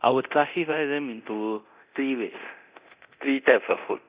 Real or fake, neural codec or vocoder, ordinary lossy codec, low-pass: fake; codec, 24 kHz, 0.9 kbps, DualCodec; Opus, 64 kbps; 3.6 kHz